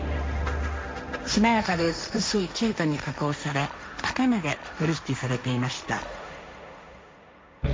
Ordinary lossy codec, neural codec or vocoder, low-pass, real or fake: none; codec, 16 kHz, 1.1 kbps, Voila-Tokenizer; none; fake